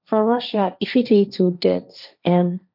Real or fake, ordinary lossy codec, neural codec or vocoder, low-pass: fake; none; codec, 16 kHz, 1.1 kbps, Voila-Tokenizer; 5.4 kHz